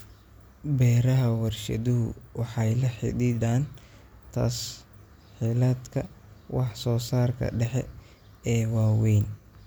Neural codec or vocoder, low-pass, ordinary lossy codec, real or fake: none; none; none; real